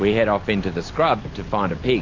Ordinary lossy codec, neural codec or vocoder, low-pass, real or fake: AAC, 32 kbps; none; 7.2 kHz; real